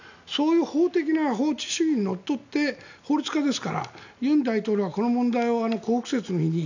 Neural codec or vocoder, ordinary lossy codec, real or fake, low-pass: none; none; real; 7.2 kHz